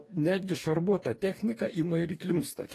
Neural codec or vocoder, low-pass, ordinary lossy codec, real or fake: codec, 44.1 kHz, 2.6 kbps, DAC; 14.4 kHz; AAC, 48 kbps; fake